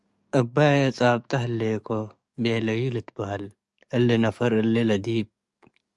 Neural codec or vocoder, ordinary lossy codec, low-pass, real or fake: codec, 44.1 kHz, 7.8 kbps, DAC; Opus, 64 kbps; 10.8 kHz; fake